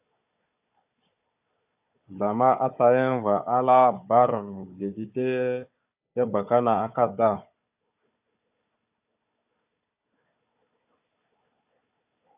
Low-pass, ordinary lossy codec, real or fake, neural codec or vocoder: 3.6 kHz; AAC, 32 kbps; fake; codec, 16 kHz, 4 kbps, FunCodec, trained on Chinese and English, 50 frames a second